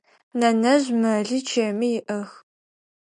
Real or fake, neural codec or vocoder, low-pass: real; none; 10.8 kHz